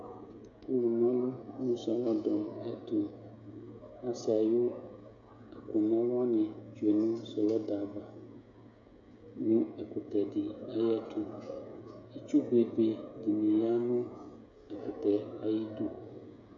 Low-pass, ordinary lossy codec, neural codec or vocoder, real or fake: 7.2 kHz; AAC, 64 kbps; codec, 16 kHz, 16 kbps, FreqCodec, smaller model; fake